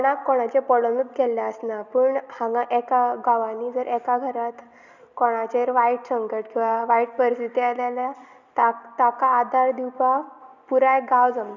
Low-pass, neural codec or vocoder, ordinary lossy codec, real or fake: 7.2 kHz; none; none; real